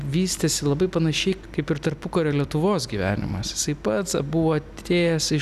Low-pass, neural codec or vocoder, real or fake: 14.4 kHz; none; real